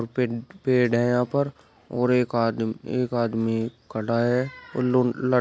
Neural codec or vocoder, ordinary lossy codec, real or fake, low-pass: none; none; real; none